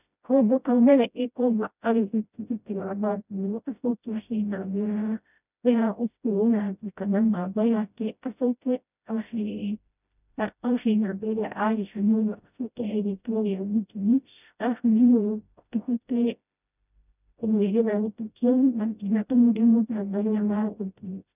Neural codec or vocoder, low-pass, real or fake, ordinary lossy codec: codec, 16 kHz, 0.5 kbps, FreqCodec, smaller model; 3.6 kHz; fake; none